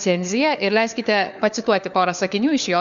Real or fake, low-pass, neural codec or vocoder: fake; 7.2 kHz; codec, 16 kHz, 4 kbps, FunCodec, trained on LibriTTS, 50 frames a second